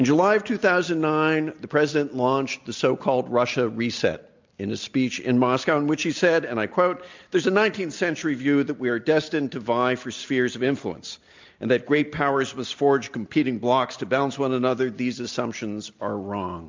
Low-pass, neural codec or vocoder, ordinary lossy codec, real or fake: 7.2 kHz; none; MP3, 64 kbps; real